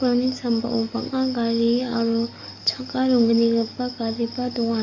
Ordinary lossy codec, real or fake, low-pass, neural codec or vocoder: none; fake; 7.2 kHz; codec, 44.1 kHz, 7.8 kbps, DAC